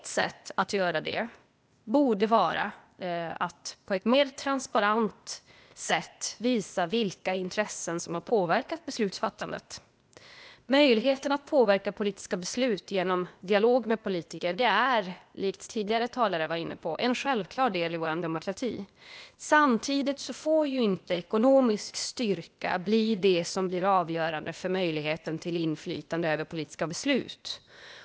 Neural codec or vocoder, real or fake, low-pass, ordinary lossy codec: codec, 16 kHz, 0.8 kbps, ZipCodec; fake; none; none